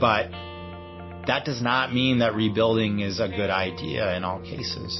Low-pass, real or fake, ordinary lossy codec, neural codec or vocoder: 7.2 kHz; real; MP3, 24 kbps; none